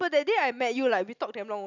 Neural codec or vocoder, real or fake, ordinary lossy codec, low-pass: none; real; none; 7.2 kHz